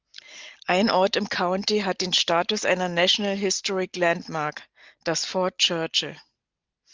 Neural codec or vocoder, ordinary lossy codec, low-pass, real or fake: none; Opus, 24 kbps; 7.2 kHz; real